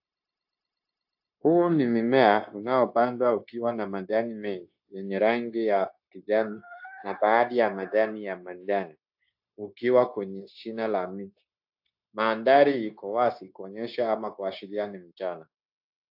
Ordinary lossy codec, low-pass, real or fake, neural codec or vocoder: AAC, 48 kbps; 5.4 kHz; fake; codec, 16 kHz, 0.9 kbps, LongCat-Audio-Codec